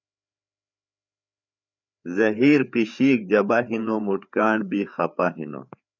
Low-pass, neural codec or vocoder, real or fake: 7.2 kHz; codec, 16 kHz, 4 kbps, FreqCodec, larger model; fake